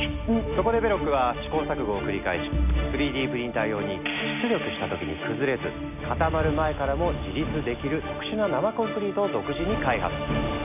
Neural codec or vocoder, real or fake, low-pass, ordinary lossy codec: none; real; 3.6 kHz; none